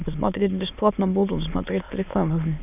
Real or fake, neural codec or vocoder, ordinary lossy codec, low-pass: fake; autoencoder, 22.05 kHz, a latent of 192 numbers a frame, VITS, trained on many speakers; none; 3.6 kHz